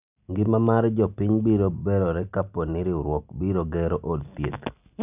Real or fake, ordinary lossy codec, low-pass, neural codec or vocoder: real; none; 3.6 kHz; none